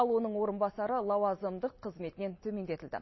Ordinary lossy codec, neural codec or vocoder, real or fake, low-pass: MP3, 24 kbps; none; real; 7.2 kHz